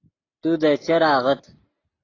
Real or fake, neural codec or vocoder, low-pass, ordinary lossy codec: real; none; 7.2 kHz; AAC, 32 kbps